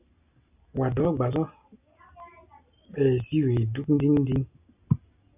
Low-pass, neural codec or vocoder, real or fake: 3.6 kHz; none; real